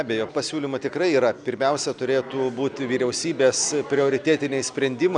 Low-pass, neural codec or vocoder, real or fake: 9.9 kHz; none; real